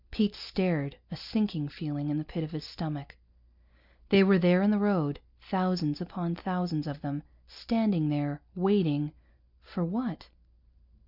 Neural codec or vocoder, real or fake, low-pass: none; real; 5.4 kHz